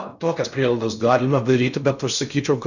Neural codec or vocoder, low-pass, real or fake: codec, 16 kHz in and 24 kHz out, 0.6 kbps, FocalCodec, streaming, 4096 codes; 7.2 kHz; fake